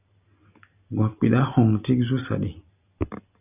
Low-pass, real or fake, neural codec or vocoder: 3.6 kHz; real; none